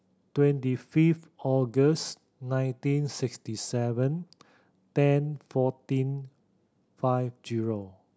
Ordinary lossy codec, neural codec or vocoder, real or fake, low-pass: none; none; real; none